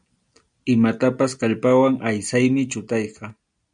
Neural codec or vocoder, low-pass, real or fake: none; 9.9 kHz; real